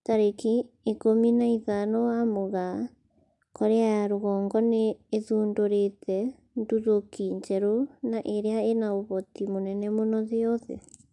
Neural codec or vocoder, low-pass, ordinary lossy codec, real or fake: none; 10.8 kHz; AAC, 64 kbps; real